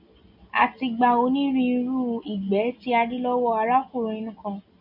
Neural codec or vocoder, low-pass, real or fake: none; 5.4 kHz; real